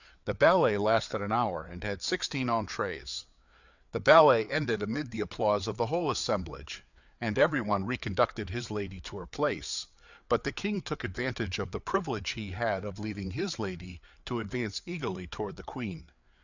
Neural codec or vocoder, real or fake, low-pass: codec, 16 kHz, 4 kbps, FreqCodec, larger model; fake; 7.2 kHz